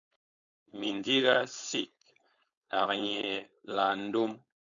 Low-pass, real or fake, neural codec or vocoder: 7.2 kHz; fake; codec, 16 kHz, 4.8 kbps, FACodec